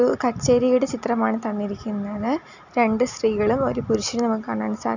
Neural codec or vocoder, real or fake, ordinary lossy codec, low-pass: none; real; none; 7.2 kHz